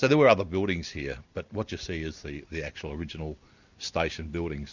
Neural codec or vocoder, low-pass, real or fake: none; 7.2 kHz; real